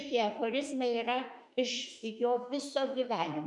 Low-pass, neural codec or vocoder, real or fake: 10.8 kHz; autoencoder, 48 kHz, 32 numbers a frame, DAC-VAE, trained on Japanese speech; fake